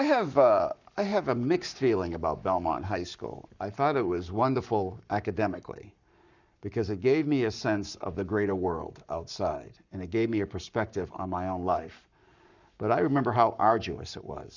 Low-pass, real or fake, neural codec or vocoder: 7.2 kHz; fake; codec, 44.1 kHz, 7.8 kbps, Pupu-Codec